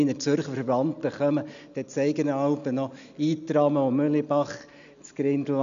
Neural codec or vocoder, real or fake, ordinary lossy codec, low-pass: none; real; none; 7.2 kHz